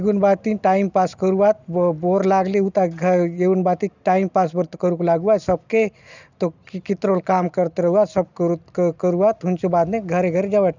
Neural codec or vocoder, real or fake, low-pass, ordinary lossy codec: none; real; 7.2 kHz; none